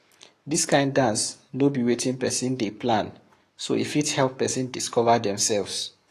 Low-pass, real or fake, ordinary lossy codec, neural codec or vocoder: 14.4 kHz; fake; AAC, 48 kbps; codec, 44.1 kHz, 7.8 kbps, Pupu-Codec